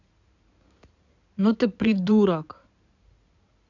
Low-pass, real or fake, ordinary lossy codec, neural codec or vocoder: 7.2 kHz; fake; none; codec, 16 kHz in and 24 kHz out, 2.2 kbps, FireRedTTS-2 codec